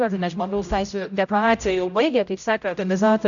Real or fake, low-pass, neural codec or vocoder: fake; 7.2 kHz; codec, 16 kHz, 0.5 kbps, X-Codec, HuBERT features, trained on general audio